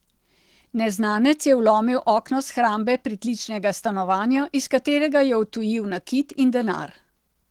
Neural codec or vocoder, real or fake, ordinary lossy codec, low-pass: autoencoder, 48 kHz, 128 numbers a frame, DAC-VAE, trained on Japanese speech; fake; Opus, 16 kbps; 19.8 kHz